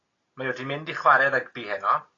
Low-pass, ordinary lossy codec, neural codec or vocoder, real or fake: 7.2 kHz; AAC, 32 kbps; none; real